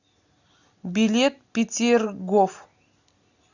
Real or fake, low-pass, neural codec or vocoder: real; 7.2 kHz; none